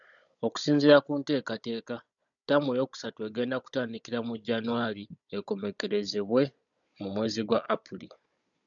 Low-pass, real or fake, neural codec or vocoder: 7.2 kHz; fake; codec, 16 kHz, 16 kbps, FunCodec, trained on Chinese and English, 50 frames a second